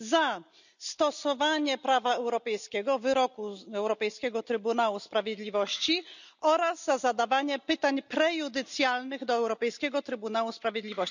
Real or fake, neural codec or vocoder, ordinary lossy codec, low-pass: real; none; none; 7.2 kHz